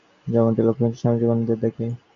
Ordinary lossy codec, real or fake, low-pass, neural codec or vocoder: MP3, 64 kbps; real; 7.2 kHz; none